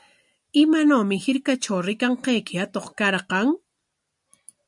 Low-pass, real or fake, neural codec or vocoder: 10.8 kHz; real; none